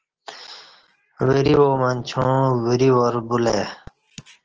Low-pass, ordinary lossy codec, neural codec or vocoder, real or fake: 7.2 kHz; Opus, 16 kbps; none; real